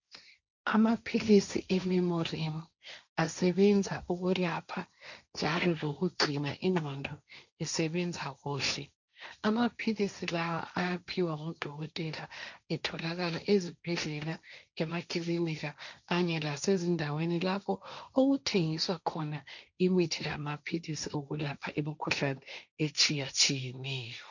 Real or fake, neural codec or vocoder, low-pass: fake; codec, 16 kHz, 1.1 kbps, Voila-Tokenizer; 7.2 kHz